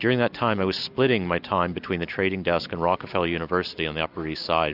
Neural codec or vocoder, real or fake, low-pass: none; real; 5.4 kHz